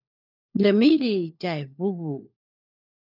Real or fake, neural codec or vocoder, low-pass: fake; codec, 16 kHz, 4 kbps, FunCodec, trained on LibriTTS, 50 frames a second; 5.4 kHz